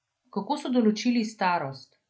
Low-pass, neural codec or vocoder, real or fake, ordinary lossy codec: none; none; real; none